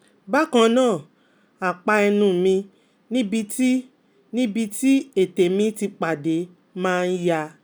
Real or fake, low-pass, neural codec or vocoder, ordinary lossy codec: real; none; none; none